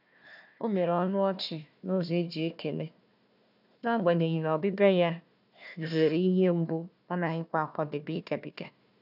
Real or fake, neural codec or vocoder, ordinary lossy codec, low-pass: fake; codec, 16 kHz, 1 kbps, FunCodec, trained on Chinese and English, 50 frames a second; none; 5.4 kHz